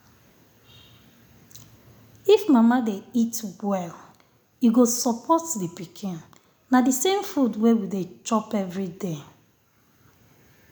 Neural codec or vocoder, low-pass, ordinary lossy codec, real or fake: none; none; none; real